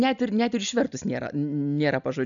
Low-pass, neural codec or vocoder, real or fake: 7.2 kHz; none; real